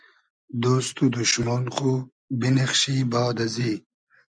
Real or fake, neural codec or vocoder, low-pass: real; none; 9.9 kHz